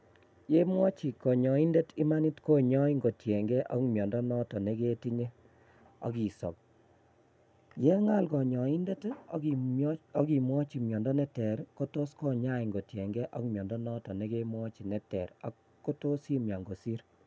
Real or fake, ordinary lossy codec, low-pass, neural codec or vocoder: real; none; none; none